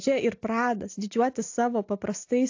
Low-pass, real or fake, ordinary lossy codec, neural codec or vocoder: 7.2 kHz; real; AAC, 48 kbps; none